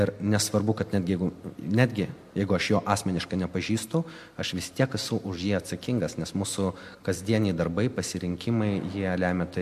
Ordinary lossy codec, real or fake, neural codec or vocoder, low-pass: MP3, 64 kbps; real; none; 14.4 kHz